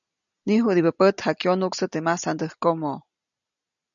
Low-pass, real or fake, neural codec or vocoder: 7.2 kHz; real; none